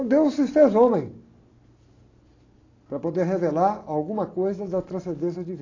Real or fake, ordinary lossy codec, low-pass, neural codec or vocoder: real; AAC, 32 kbps; 7.2 kHz; none